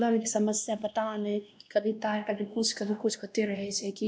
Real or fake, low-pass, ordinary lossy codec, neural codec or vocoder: fake; none; none; codec, 16 kHz, 1 kbps, X-Codec, WavLM features, trained on Multilingual LibriSpeech